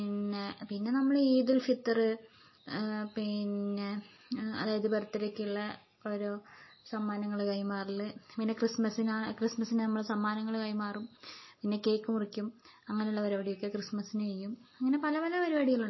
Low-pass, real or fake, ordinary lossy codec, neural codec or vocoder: 7.2 kHz; real; MP3, 24 kbps; none